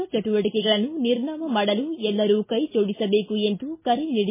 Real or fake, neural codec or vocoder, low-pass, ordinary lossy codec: real; none; 3.6 kHz; MP3, 16 kbps